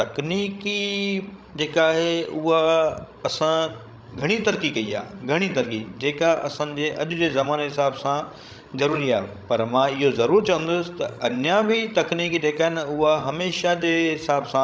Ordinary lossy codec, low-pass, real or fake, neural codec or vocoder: none; none; fake; codec, 16 kHz, 16 kbps, FreqCodec, larger model